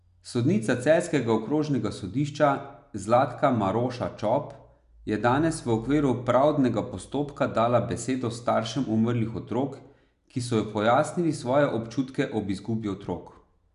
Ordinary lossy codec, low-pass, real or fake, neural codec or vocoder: none; 10.8 kHz; real; none